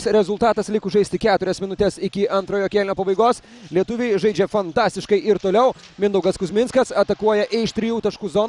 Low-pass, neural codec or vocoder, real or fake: 10.8 kHz; none; real